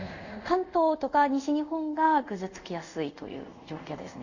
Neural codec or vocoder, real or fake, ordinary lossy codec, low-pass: codec, 24 kHz, 0.5 kbps, DualCodec; fake; none; 7.2 kHz